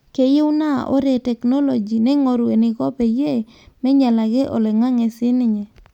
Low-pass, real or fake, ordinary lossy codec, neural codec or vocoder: 19.8 kHz; real; none; none